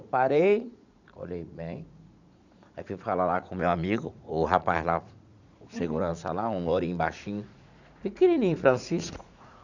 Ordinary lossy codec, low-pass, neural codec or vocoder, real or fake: none; 7.2 kHz; vocoder, 44.1 kHz, 80 mel bands, Vocos; fake